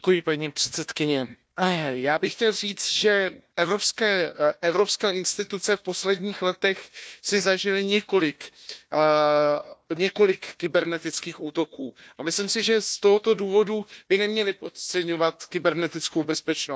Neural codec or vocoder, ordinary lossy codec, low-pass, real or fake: codec, 16 kHz, 1 kbps, FunCodec, trained on Chinese and English, 50 frames a second; none; none; fake